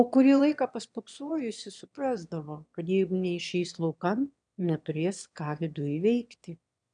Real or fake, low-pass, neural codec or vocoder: fake; 9.9 kHz; autoencoder, 22.05 kHz, a latent of 192 numbers a frame, VITS, trained on one speaker